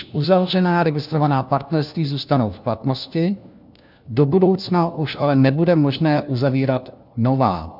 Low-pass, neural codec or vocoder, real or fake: 5.4 kHz; codec, 16 kHz, 1 kbps, FunCodec, trained on LibriTTS, 50 frames a second; fake